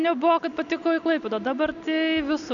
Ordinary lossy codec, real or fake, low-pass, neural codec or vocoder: MP3, 96 kbps; real; 7.2 kHz; none